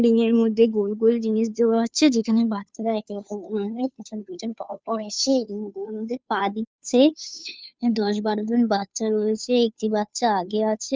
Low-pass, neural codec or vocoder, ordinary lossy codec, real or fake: none; codec, 16 kHz, 2 kbps, FunCodec, trained on Chinese and English, 25 frames a second; none; fake